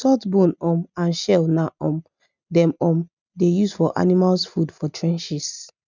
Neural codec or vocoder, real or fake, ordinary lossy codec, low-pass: none; real; none; 7.2 kHz